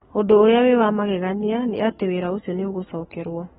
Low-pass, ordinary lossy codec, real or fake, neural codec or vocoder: 19.8 kHz; AAC, 16 kbps; fake; codec, 44.1 kHz, 7.8 kbps, Pupu-Codec